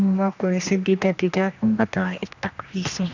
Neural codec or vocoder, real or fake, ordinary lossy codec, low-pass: codec, 16 kHz, 1 kbps, X-Codec, HuBERT features, trained on general audio; fake; none; 7.2 kHz